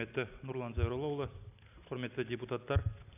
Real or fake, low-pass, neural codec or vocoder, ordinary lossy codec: real; 3.6 kHz; none; none